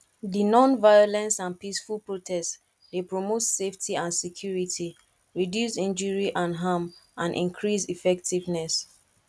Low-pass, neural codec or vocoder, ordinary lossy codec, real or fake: none; none; none; real